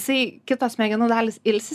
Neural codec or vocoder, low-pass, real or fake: none; 14.4 kHz; real